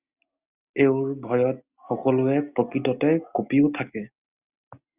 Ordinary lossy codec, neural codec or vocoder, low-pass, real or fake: Opus, 64 kbps; none; 3.6 kHz; real